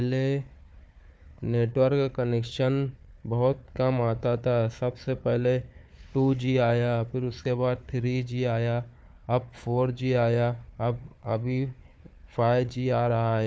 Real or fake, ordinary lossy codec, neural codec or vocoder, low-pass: fake; none; codec, 16 kHz, 4 kbps, FunCodec, trained on Chinese and English, 50 frames a second; none